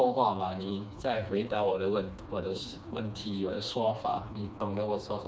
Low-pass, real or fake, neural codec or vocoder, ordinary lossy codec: none; fake; codec, 16 kHz, 2 kbps, FreqCodec, smaller model; none